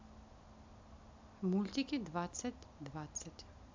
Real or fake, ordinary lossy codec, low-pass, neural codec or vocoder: real; MP3, 64 kbps; 7.2 kHz; none